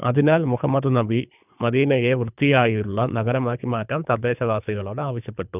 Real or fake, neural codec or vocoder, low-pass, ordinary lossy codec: fake; codec, 24 kHz, 3 kbps, HILCodec; 3.6 kHz; none